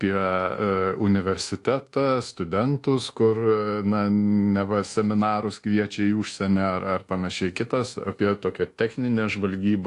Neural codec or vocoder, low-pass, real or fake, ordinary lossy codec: codec, 24 kHz, 1.2 kbps, DualCodec; 10.8 kHz; fake; AAC, 48 kbps